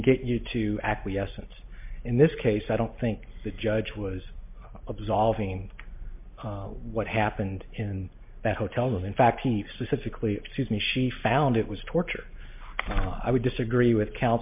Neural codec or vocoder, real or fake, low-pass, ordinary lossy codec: none; real; 3.6 kHz; MP3, 32 kbps